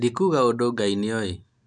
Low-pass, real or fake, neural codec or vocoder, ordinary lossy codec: 9.9 kHz; real; none; none